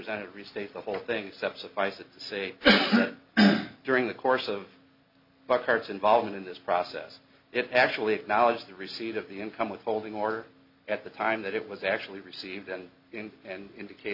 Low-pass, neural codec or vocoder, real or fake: 5.4 kHz; none; real